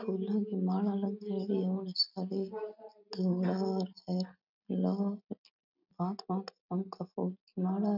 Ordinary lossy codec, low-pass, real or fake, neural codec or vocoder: none; 5.4 kHz; real; none